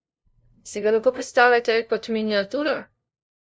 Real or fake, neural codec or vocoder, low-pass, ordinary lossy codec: fake; codec, 16 kHz, 0.5 kbps, FunCodec, trained on LibriTTS, 25 frames a second; none; none